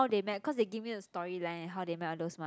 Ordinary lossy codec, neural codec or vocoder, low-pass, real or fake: none; none; none; real